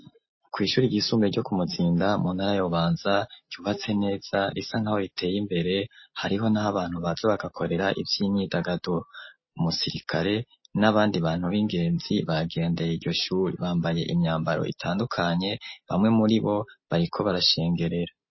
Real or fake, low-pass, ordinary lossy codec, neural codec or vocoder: real; 7.2 kHz; MP3, 24 kbps; none